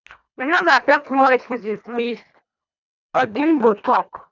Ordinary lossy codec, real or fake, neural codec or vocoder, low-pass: none; fake; codec, 24 kHz, 1.5 kbps, HILCodec; 7.2 kHz